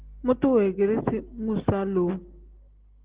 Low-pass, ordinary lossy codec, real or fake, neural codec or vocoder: 3.6 kHz; Opus, 16 kbps; real; none